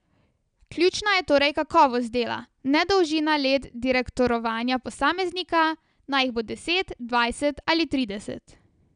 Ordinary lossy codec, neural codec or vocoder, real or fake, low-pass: none; none; real; 9.9 kHz